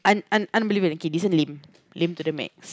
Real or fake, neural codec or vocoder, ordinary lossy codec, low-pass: real; none; none; none